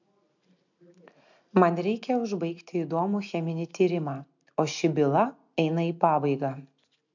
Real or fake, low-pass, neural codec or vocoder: real; 7.2 kHz; none